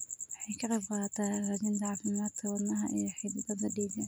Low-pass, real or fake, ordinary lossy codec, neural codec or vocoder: none; fake; none; vocoder, 44.1 kHz, 128 mel bands every 256 samples, BigVGAN v2